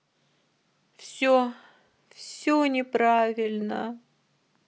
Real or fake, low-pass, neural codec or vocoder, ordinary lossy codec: real; none; none; none